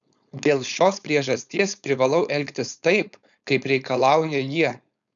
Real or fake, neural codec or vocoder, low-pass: fake; codec, 16 kHz, 4.8 kbps, FACodec; 7.2 kHz